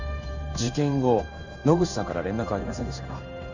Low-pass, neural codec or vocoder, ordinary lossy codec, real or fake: 7.2 kHz; codec, 16 kHz in and 24 kHz out, 1 kbps, XY-Tokenizer; none; fake